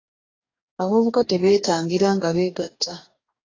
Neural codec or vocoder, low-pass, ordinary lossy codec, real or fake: codec, 44.1 kHz, 2.6 kbps, DAC; 7.2 kHz; AAC, 32 kbps; fake